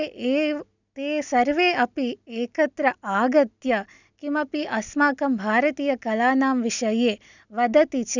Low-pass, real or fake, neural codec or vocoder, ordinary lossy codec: 7.2 kHz; real; none; none